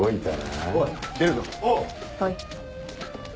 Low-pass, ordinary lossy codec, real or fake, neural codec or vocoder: none; none; real; none